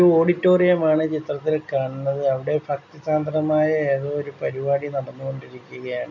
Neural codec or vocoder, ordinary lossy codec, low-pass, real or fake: none; none; 7.2 kHz; real